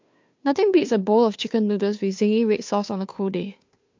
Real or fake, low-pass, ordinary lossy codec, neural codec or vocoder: fake; 7.2 kHz; MP3, 48 kbps; codec, 16 kHz, 2 kbps, FunCodec, trained on Chinese and English, 25 frames a second